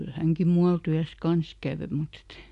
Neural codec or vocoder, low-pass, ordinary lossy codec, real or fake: none; 10.8 kHz; AAC, 96 kbps; real